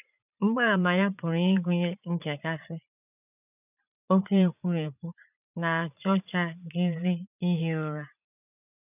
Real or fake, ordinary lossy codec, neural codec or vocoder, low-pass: fake; none; vocoder, 44.1 kHz, 80 mel bands, Vocos; 3.6 kHz